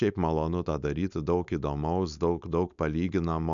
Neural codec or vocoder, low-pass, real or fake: codec, 16 kHz, 4.8 kbps, FACodec; 7.2 kHz; fake